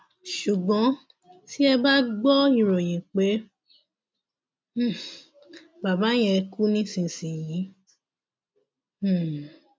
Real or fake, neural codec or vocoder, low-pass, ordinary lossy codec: real; none; none; none